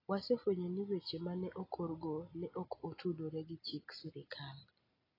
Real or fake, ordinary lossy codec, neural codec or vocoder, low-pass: real; AAC, 24 kbps; none; 5.4 kHz